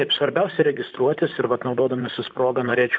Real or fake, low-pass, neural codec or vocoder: fake; 7.2 kHz; vocoder, 44.1 kHz, 128 mel bands, Pupu-Vocoder